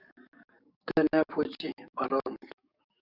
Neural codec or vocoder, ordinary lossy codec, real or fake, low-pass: codec, 44.1 kHz, 7.8 kbps, DAC; Opus, 64 kbps; fake; 5.4 kHz